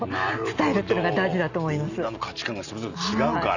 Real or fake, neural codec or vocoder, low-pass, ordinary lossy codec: real; none; 7.2 kHz; none